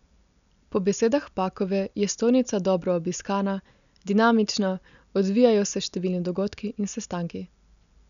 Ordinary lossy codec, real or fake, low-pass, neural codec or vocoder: none; real; 7.2 kHz; none